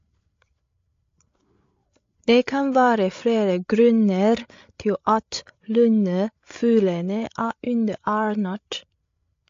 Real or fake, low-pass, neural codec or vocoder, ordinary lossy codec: fake; 7.2 kHz; codec, 16 kHz, 8 kbps, FreqCodec, larger model; AAC, 48 kbps